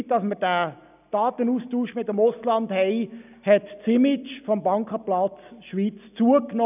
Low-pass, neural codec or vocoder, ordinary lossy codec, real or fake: 3.6 kHz; none; none; real